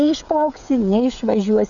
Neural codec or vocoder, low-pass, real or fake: codec, 16 kHz, 16 kbps, FreqCodec, smaller model; 7.2 kHz; fake